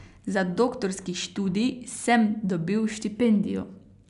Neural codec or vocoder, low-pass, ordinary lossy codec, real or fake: none; 10.8 kHz; none; real